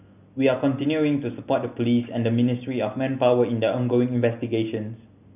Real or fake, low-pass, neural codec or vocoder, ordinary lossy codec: real; 3.6 kHz; none; none